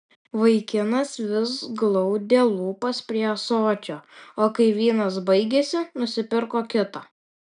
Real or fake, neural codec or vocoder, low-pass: real; none; 9.9 kHz